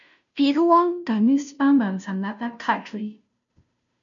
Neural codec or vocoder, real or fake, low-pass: codec, 16 kHz, 0.5 kbps, FunCodec, trained on Chinese and English, 25 frames a second; fake; 7.2 kHz